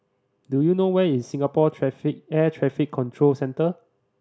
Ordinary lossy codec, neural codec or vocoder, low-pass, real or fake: none; none; none; real